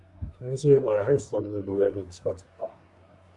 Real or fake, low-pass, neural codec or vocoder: fake; 10.8 kHz; codec, 24 kHz, 1 kbps, SNAC